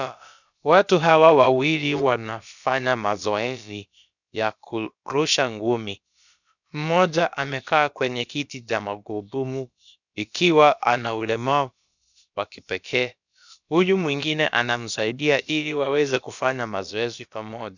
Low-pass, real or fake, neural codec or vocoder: 7.2 kHz; fake; codec, 16 kHz, about 1 kbps, DyCAST, with the encoder's durations